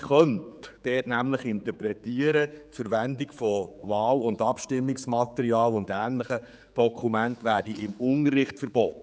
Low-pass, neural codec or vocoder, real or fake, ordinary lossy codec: none; codec, 16 kHz, 4 kbps, X-Codec, HuBERT features, trained on general audio; fake; none